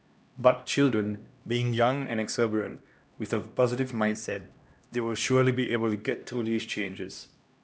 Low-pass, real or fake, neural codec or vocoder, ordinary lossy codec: none; fake; codec, 16 kHz, 1 kbps, X-Codec, HuBERT features, trained on LibriSpeech; none